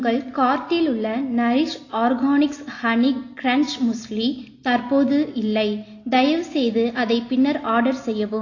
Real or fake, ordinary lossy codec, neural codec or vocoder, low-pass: real; AAC, 32 kbps; none; 7.2 kHz